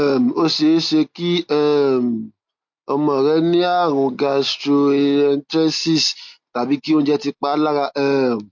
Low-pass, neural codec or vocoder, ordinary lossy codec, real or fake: 7.2 kHz; none; MP3, 48 kbps; real